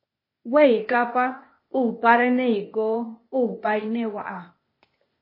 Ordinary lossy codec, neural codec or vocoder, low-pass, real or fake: MP3, 24 kbps; codec, 16 kHz, 0.8 kbps, ZipCodec; 5.4 kHz; fake